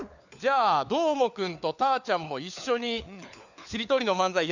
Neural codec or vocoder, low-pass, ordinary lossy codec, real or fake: codec, 16 kHz, 4 kbps, FunCodec, trained on LibriTTS, 50 frames a second; 7.2 kHz; none; fake